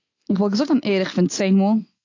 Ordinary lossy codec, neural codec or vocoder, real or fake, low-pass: AAC, 48 kbps; none; real; 7.2 kHz